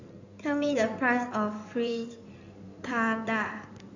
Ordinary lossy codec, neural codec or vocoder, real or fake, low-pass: none; codec, 16 kHz in and 24 kHz out, 2.2 kbps, FireRedTTS-2 codec; fake; 7.2 kHz